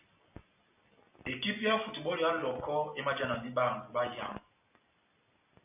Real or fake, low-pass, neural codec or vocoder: real; 3.6 kHz; none